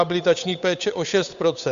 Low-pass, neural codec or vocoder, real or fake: 7.2 kHz; codec, 16 kHz, 8 kbps, FunCodec, trained on Chinese and English, 25 frames a second; fake